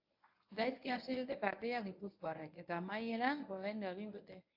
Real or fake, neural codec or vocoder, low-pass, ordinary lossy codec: fake; codec, 24 kHz, 0.9 kbps, WavTokenizer, medium speech release version 1; 5.4 kHz; AAC, 48 kbps